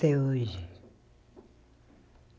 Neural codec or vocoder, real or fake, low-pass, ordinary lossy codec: none; real; none; none